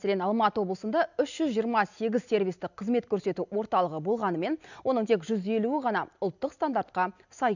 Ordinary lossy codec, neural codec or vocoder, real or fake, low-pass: none; none; real; 7.2 kHz